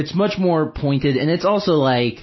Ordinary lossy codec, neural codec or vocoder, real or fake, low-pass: MP3, 24 kbps; none; real; 7.2 kHz